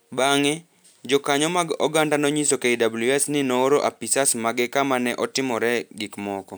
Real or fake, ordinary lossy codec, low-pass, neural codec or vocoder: real; none; none; none